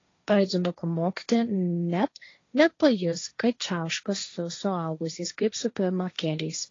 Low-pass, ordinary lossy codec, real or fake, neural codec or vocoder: 7.2 kHz; AAC, 32 kbps; fake; codec, 16 kHz, 1.1 kbps, Voila-Tokenizer